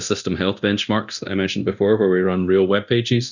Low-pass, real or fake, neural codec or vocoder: 7.2 kHz; fake; codec, 24 kHz, 0.9 kbps, DualCodec